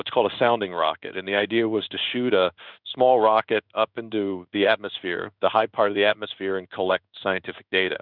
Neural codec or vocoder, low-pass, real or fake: codec, 16 kHz in and 24 kHz out, 1 kbps, XY-Tokenizer; 5.4 kHz; fake